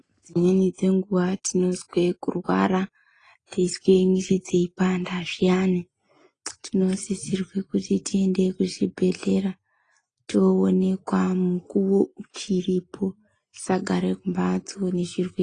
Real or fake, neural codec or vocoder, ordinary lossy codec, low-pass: real; none; AAC, 32 kbps; 9.9 kHz